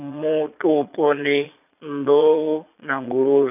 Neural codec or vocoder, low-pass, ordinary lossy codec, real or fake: vocoder, 22.05 kHz, 80 mel bands, Vocos; 3.6 kHz; none; fake